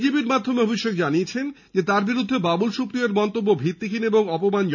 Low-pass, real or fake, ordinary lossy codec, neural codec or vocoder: 7.2 kHz; real; none; none